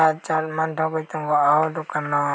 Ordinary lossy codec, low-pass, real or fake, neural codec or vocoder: none; none; real; none